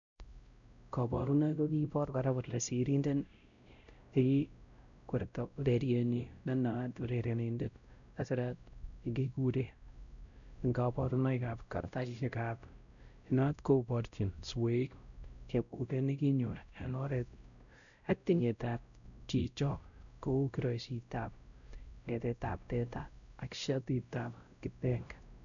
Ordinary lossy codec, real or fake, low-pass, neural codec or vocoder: none; fake; 7.2 kHz; codec, 16 kHz, 0.5 kbps, X-Codec, WavLM features, trained on Multilingual LibriSpeech